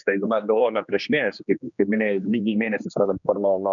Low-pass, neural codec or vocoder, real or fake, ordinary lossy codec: 7.2 kHz; codec, 16 kHz, 2 kbps, X-Codec, HuBERT features, trained on general audio; fake; MP3, 96 kbps